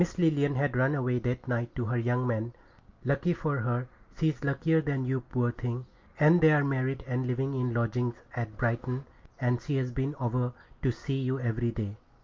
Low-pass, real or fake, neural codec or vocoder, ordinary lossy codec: 7.2 kHz; real; none; Opus, 24 kbps